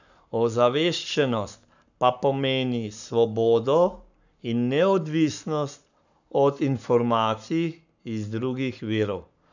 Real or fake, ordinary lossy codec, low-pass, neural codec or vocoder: fake; none; 7.2 kHz; codec, 44.1 kHz, 7.8 kbps, Pupu-Codec